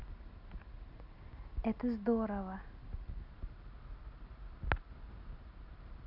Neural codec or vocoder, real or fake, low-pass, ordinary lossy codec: none; real; 5.4 kHz; none